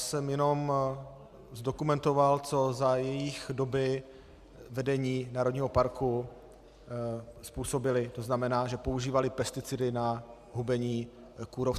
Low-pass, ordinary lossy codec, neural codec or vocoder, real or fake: 14.4 kHz; Opus, 64 kbps; none; real